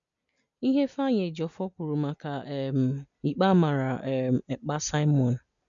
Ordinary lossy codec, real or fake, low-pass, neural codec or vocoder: none; real; 7.2 kHz; none